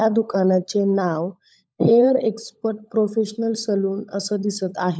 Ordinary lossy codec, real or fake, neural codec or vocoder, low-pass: none; fake; codec, 16 kHz, 16 kbps, FunCodec, trained on LibriTTS, 50 frames a second; none